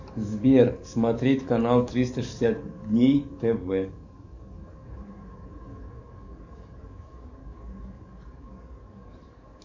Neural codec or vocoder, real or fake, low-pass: codec, 44.1 kHz, 7.8 kbps, DAC; fake; 7.2 kHz